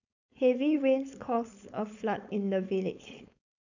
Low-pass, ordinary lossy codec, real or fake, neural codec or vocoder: 7.2 kHz; none; fake; codec, 16 kHz, 4.8 kbps, FACodec